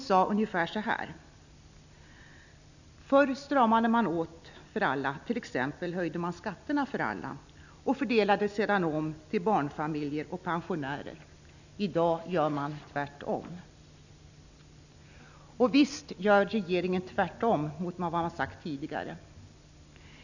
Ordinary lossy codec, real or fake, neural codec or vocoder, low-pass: none; real; none; 7.2 kHz